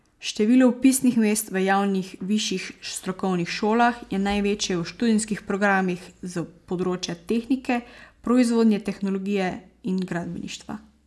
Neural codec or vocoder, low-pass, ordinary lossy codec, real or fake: none; none; none; real